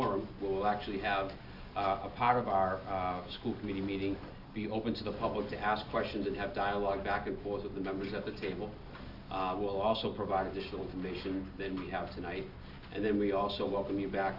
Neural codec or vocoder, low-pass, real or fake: none; 5.4 kHz; real